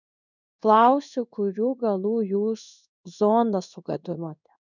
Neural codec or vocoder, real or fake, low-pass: codec, 16 kHz in and 24 kHz out, 1 kbps, XY-Tokenizer; fake; 7.2 kHz